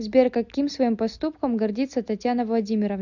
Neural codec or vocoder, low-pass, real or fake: none; 7.2 kHz; real